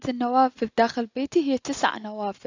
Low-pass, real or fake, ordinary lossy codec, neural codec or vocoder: 7.2 kHz; real; AAC, 48 kbps; none